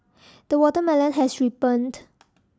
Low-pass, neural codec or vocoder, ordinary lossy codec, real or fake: none; none; none; real